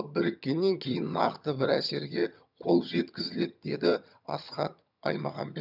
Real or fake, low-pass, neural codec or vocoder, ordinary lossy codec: fake; 5.4 kHz; vocoder, 22.05 kHz, 80 mel bands, HiFi-GAN; none